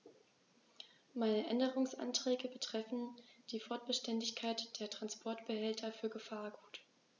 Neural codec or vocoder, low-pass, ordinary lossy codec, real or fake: none; 7.2 kHz; none; real